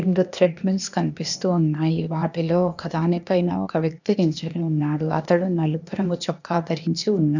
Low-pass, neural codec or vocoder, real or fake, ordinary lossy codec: 7.2 kHz; codec, 16 kHz, 0.8 kbps, ZipCodec; fake; none